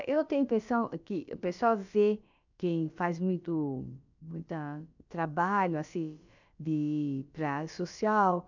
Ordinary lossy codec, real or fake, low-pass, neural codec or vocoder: none; fake; 7.2 kHz; codec, 16 kHz, about 1 kbps, DyCAST, with the encoder's durations